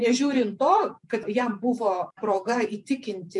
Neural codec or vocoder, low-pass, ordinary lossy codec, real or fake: vocoder, 48 kHz, 128 mel bands, Vocos; 10.8 kHz; MP3, 64 kbps; fake